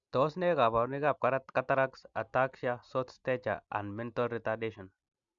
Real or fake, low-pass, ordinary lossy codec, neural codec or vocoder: real; 7.2 kHz; Opus, 64 kbps; none